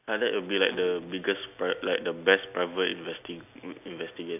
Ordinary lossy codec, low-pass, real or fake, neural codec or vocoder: none; 3.6 kHz; real; none